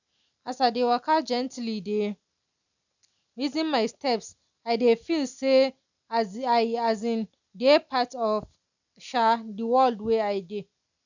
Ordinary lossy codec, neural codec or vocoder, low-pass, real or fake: none; none; 7.2 kHz; real